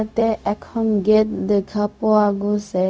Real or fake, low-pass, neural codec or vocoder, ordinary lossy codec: fake; none; codec, 16 kHz, 0.4 kbps, LongCat-Audio-Codec; none